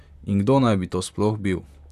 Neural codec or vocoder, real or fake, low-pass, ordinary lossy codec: vocoder, 44.1 kHz, 128 mel bands, Pupu-Vocoder; fake; 14.4 kHz; none